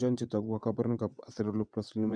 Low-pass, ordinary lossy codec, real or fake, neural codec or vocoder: 9.9 kHz; none; fake; vocoder, 22.05 kHz, 80 mel bands, WaveNeXt